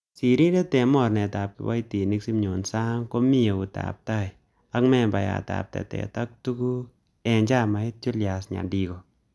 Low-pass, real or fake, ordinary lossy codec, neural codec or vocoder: none; real; none; none